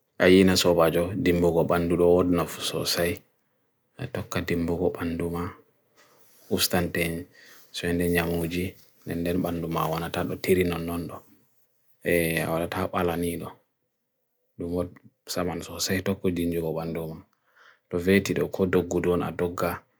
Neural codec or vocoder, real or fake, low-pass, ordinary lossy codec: none; real; none; none